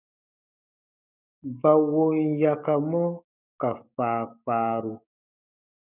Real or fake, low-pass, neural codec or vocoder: fake; 3.6 kHz; codec, 16 kHz, 6 kbps, DAC